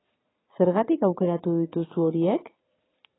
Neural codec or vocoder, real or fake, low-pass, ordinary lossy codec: vocoder, 22.05 kHz, 80 mel bands, Vocos; fake; 7.2 kHz; AAC, 16 kbps